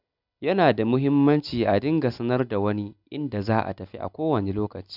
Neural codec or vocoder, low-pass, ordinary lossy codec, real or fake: none; 5.4 kHz; none; real